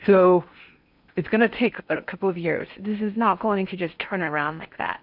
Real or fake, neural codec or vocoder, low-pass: fake; codec, 16 kHz in and 24 kHz out, 0.8 kbps, FocalCodec, streaming, 65536 codes; 5.4 kHz